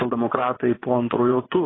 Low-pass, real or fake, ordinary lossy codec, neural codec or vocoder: 7.2 kHz; fake; AAC, 16 kbps; vocoder, 24 kHz, 100 mel bands, Vocos